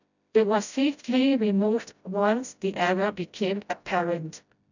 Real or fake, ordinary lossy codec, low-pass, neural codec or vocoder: fake; none; 7.2 kHz; codec, 16 kHz, 0.5 kbps, FreqCodec, smaller model